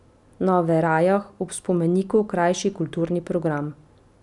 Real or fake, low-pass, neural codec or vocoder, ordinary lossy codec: real; 10.8 kHz; none; none